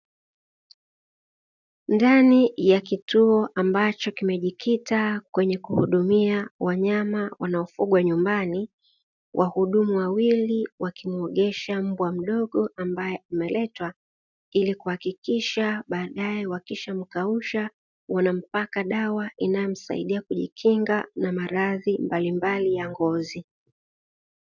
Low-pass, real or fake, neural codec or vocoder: 7.2 kHz; real; none